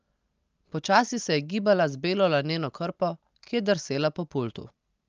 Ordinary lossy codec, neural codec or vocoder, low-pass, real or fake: Opus, 24 kbps; none; 7.2 kHz; real